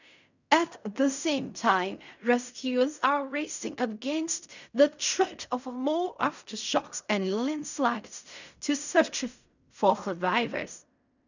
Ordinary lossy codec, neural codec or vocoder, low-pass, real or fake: none; codec, 16 kHz in and 24 kHz out, 0.4 kbps, LongCat-Audio-Codec, fine tuned four codebook decoder; 7.2 kHz; fake